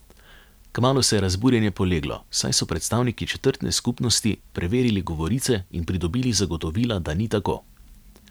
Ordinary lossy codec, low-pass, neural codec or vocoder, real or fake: none; none; none; real